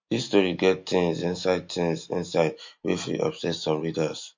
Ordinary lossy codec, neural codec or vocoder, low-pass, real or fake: MP3, 48 kbps; none; 7.2 kHz; real